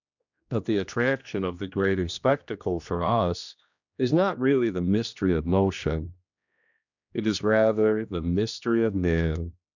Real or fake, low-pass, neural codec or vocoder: fake; 7.2 kHz; codec, 16 kHz, 1 kbps, X-Codec, HuBERT features, trained on general audio